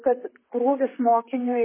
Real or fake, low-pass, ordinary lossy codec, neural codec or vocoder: fake; 3.6 kHz; MP3, 16 kbps; vocoder, 44.1 kHz, 128 mel bands, Pupu-Vocoder